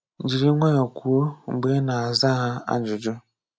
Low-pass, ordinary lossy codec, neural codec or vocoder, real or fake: none; none; none; real